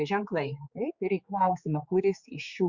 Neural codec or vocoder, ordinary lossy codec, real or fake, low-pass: codec, 16 kHz, 4 kbps, X-Codec, HuBERT features, trained on general audio; Opus, 64 kbps; fake; 7.2 kHz